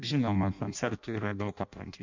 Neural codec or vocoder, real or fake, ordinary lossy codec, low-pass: codec, 16 kHz in and 24 kHz out, 0.6 kbps, FireRedTTS-2 codec; fake; MP3, 64 kbps; 7.2 kHz